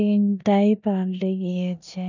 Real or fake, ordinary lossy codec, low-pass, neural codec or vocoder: fake; none; 7.2 kHz; codec, 16 kHz in and 24 kHz out, 0.9 kbps, LongCat-Audio-Codec, four codebook decoder